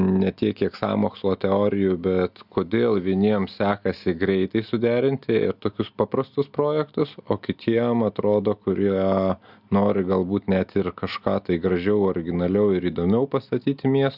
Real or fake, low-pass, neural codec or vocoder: real; 5.4 kHz; none